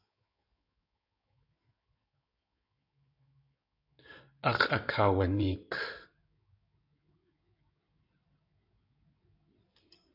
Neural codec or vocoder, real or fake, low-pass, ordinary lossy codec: codec, 16 kHz in and 24 kHz out, 2.2 kbps, FireRedTTS-2 codec; fake; 5.4 kHz; AAC, 48 kbps